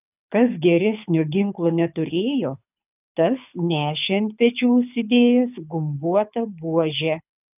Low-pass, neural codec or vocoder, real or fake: 3.6 kHz; codec, 24 kHz, 6 kbps, HILCodec; fake